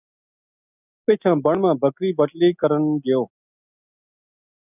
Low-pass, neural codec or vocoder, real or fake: 3.6 kHz; none; real